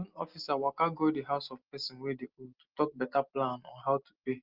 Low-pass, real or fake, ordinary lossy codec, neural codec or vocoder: 5.4 kHz; real; Opus, 32 kbps; none